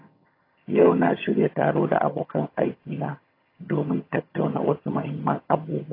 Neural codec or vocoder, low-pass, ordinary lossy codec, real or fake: vocoder, 22.05 kHz, 80 mel bands, HiFi-GAN; 5.4 kHz; AAC, 24 kbps; fake